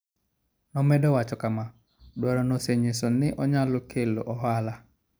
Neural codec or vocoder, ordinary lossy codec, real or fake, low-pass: none; none; real; none